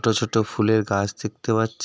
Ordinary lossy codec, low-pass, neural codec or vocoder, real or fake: none; none; none; real